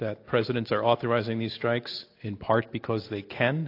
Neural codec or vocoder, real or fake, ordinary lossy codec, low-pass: none; real; AAC, 32 kbps; 5.4 kHz